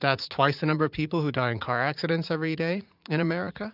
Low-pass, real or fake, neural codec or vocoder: 5.4 kHz; fake; vocoder, 44.1 kHz, 80 mel bands, Vocos